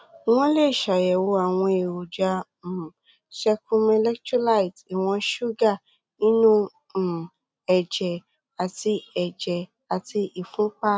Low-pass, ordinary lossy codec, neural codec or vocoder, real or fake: none; none; none; real